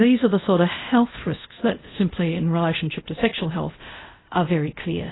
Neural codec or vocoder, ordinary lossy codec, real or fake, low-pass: codec, 24 kHz, 0.5 kbps, DualCodec; AAC, 16 kbps; fake; 7.2 kHz